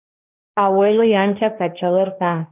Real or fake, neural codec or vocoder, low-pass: fake; codec, 16 kHz, 1.1 kbps, Voila-Tokenizer; 3.6 kHz